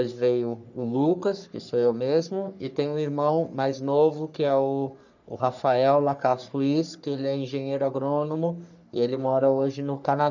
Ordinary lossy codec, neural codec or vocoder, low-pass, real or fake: none; codec, 44.1 kHz, 3.4 kbps, Pupu-Codec; 7.2 kHz; fake